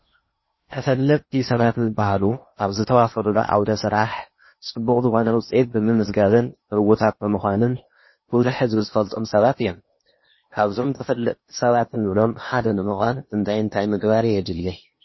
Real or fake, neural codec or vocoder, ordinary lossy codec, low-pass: fake; codec, 16 kHz in and 24 kHz out, 0.8 kbps, FocalCodec, streaming, 65536 codes; MP3, 24 kbps; 7.2 kHz